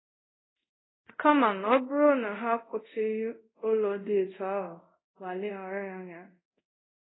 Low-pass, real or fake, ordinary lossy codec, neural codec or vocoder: 7.2 kHz; fake; AAC, 16 kbps; codec, 24 kHz, 0.5 kbps, DualCodec